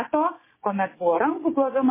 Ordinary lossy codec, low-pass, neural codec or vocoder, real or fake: MP3, 16 kbps; 3.6 kHz; codec, 16 kHz, 6 kbps, DAC; fake